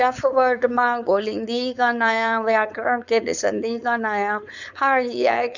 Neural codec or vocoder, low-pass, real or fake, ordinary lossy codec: codec, 16 kHz, 4.8 kbps, FACodec; 7.2 kHz; fake; none